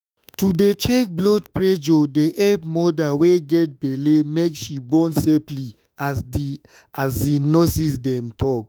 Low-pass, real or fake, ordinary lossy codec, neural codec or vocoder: none; fake; none; autoencoder, 48 kHz, 32 numbers a frame, DAC-VAE, trained on Japanese speech